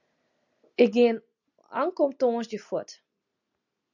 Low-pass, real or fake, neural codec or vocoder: 7.2 kHz; real; none